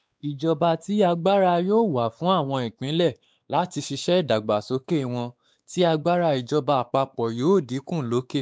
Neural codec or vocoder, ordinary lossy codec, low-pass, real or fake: codec, 16 kHz, 4 kbps, X-Codec, WavLM features, trained on Multilingual LibriSpeech; none; none; fake